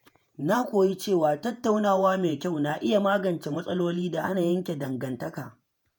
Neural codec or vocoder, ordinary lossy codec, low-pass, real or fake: vocoder, 48 kHz, 128 mel bands, Vocos; none; none; fake